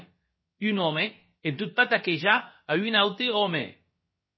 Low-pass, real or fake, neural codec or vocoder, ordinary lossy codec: 7.2 kHz; fake; codec, 16 kHz, about 1 kbps, DyCAST, with the encoder's durations; MP3, 24 kbps